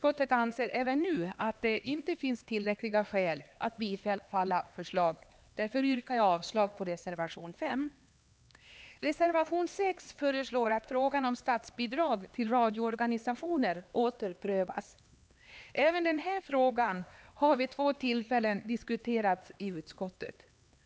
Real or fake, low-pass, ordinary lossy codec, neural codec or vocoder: fake; none; none; codec, 16 kHz, 2 kbps, X-Codec, HuBERT features, trained on LibriSpeech